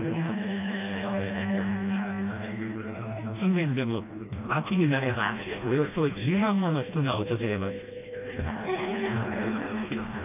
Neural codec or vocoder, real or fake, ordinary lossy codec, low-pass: codec, 16 kHz, 1 kbps, FreqCodec, smaller model; fake; AAC, 32 kbps; 3.6 kHz